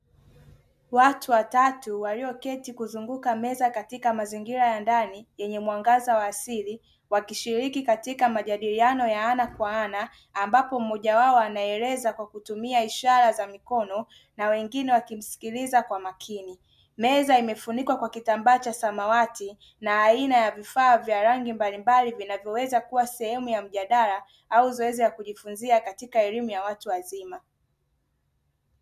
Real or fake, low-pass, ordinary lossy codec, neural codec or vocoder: real; 14.4 kHz; MP3, 96 kbps; none